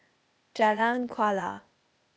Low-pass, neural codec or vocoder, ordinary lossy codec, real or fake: none; codec, 16 kHz, 0.8 kbps, ZipCodec; none; fake